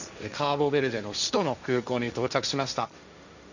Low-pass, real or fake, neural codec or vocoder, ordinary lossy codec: 7.2 kHz; fake; codec, 16 kHz, 1.1 kbps, Voila-Tokenizer; none